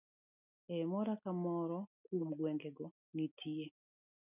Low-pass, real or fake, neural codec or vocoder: 3.6 kHz; real; none